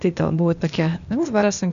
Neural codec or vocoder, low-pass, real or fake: codec, 16 kHz, 0.8 kbps, ZipCodec; 7.2 kHz; fake